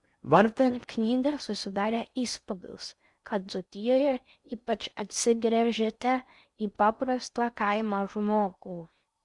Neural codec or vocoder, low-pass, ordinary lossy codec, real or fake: codec, 16 kHz in and 24 kHz out, 0.6 kbps, FocalCodec, streaming, 4096 codes; 10.8 kHz; Opus, 64 kbps; fake